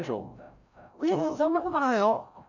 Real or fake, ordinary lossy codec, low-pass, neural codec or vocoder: fake; none; 7.2 kHz; codec, 16 kHz, 0.5 kbps, FreqCodec, larger model